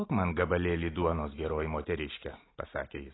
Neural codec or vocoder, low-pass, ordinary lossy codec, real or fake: none; 7.2 kHz; AAC, 16 kbps; real